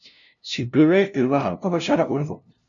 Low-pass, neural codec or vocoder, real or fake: 7.2 kHz; codec, 16 kHz, 0.5 kbps, FunCodec, trained on LibriTTS, 25 frames a second; fake